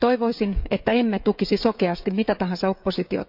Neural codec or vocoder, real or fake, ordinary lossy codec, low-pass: codec, 16 kHz, 16 kbps, FreqCodec, smaller model; fake; none; 5.4 kHz